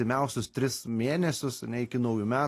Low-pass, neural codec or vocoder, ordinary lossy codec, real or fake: 14.4 kHz; none; AAC, 48 kbps; real